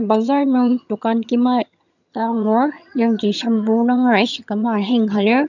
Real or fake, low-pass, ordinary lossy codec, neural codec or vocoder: fake; 7.2 kHz; none; vocoder, 22.05 kHz, 80 mel bands, HiFi-GAN